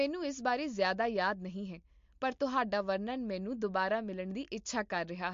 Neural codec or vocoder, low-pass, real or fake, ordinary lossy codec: none; 7.2 kHz; real; MP3, 48 kbps